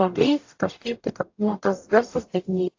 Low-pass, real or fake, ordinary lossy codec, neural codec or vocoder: 7.2 kHz; fake; AAC, 48 kbps; codec, 44.1 kHz, 0.9 kbps, DAC